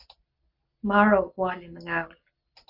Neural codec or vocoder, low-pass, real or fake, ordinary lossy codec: none; 5.4 kHz; real; AAC, 48 kbps